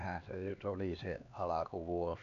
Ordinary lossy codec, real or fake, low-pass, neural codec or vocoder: none; fake; 7.2 kHz; codec, 16 kHz, 2 kbps, X-Codec, HuBERT features, trained on LibriSpeech